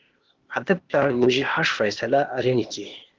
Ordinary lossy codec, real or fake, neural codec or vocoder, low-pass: Opus, 16 kbps; fake; codec, 16 kHz, 0.8 kbps, ZipCodec; 7.2 kHz